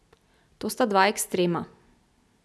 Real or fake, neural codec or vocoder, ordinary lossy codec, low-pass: real; none; none; none